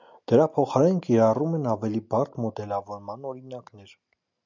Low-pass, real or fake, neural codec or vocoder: 7.2 kHz; real; none